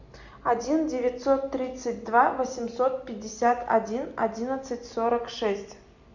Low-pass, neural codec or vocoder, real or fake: 7.2 kHz; none; real